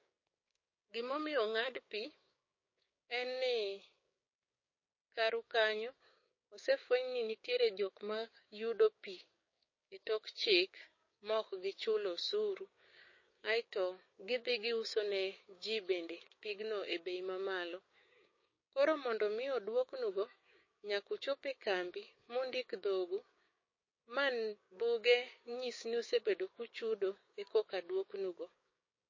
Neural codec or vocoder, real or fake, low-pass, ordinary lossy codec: codec, 16 kHz, 6 kbps, DAC; fake; 7.2 kHz; MP3, 32 kbps